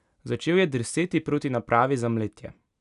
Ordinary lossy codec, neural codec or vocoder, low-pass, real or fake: none; none; 10.8 kHz; real